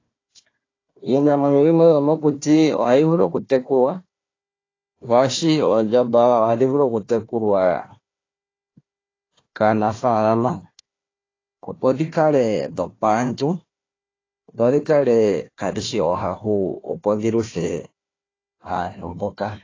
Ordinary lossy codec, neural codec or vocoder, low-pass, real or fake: AAC, 32 kbps; codec, 16 kHz, 1 kbps, FunCodec, trained on Chinese and English, 50 frames a second; 7.2 kHz; fake